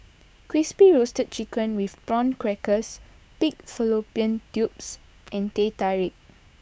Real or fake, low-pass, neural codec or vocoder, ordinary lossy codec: fake; none; codec, 16 kHz, 6 kbps, DAC; none